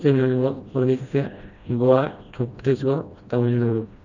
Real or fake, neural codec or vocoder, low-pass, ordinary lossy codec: fake; codec, 16 kHz, 1 kbps, FreqCodec, smaller model; 7.2 kHz; none